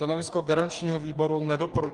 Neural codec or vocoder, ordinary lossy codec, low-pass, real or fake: codec, 44.1 kHz, 2.6 kbps, DAC; Opus, 32 kbps; 10.8 kHz; fake